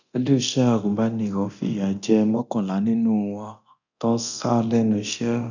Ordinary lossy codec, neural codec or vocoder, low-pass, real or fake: none; codec, 24 kHz, 0.9 kbps, DualCodec; 7.2 kHz; fake